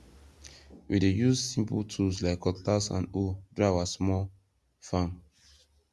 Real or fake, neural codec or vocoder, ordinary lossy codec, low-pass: real; none; none; none